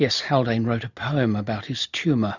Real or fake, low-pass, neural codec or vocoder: real; 7.2 kHz; none